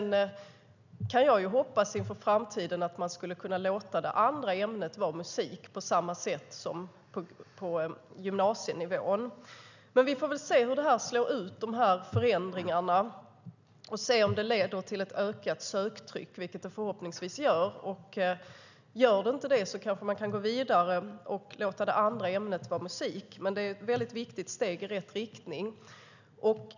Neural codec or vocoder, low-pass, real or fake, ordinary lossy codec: none; 7.2 kHz; real; none